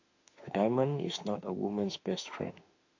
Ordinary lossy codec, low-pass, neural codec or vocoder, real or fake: AAC, 48 kbps; 7.2 kHz; autoencoder, 48 kHz, 32 numbers a frame, DAC-VAE, trained on Japanese speech; fake